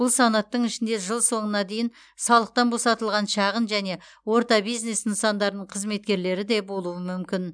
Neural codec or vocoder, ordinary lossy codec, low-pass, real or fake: none; none; 9.9 kHz; real